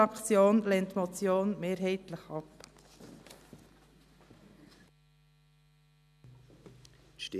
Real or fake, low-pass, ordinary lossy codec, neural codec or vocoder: real; 14.4 kHz; none; none